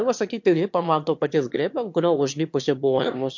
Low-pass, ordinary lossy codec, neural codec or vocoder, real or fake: 7.2 kHz; MP3, 48 kbps; autoencoder, 22.05 kHz, a latent of 192 numbers a frame, VITS, trained on one speaker; fake